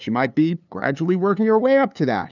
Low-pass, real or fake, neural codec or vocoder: 7.2 kHz; fake; codec, 16 kHz, 2 kbps, FunCodec, trained on LibriTTS, 25 frames a second